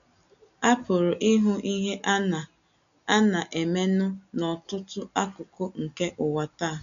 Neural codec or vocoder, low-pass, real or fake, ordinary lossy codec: none; 7.2 kHz; real; none